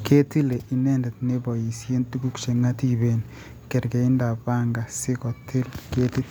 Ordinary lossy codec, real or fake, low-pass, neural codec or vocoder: none; real; none; none